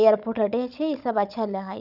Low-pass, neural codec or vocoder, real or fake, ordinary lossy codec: 5.4 kHz; codec, 16 kHz, 16 kbps, FunCodec, trained on Chinese and English, 50 frames a second; fake; none